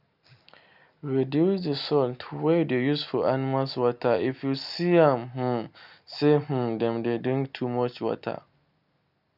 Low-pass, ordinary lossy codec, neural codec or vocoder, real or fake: 5.4 kHz; none; none; real